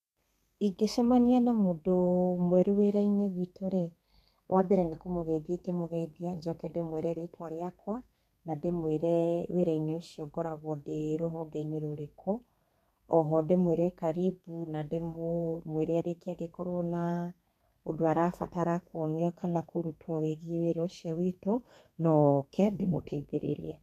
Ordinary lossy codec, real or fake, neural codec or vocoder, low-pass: none; fake; codec, 32 kHz, 1.9 kbps, SNAC; 14.4 kHz